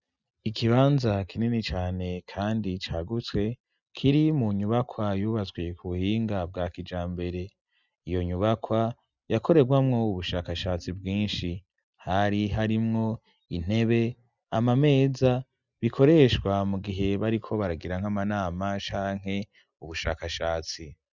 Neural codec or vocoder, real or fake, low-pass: none; real; 7.2 kHz